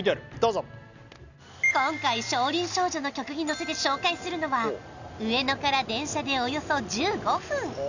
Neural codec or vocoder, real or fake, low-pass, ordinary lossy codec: none; real; 7.2 kHz; none